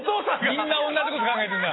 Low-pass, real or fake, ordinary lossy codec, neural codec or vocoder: 7.2 kHz; real; AAC, 16 kbps; none